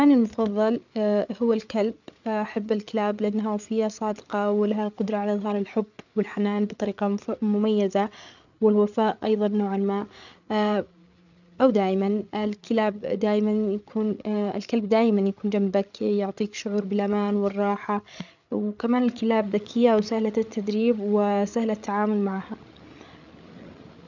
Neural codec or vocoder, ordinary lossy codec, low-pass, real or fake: codec, 16 kHz, 8 kbps, FreqCodec, larger model; none; 7.2 kHz; fake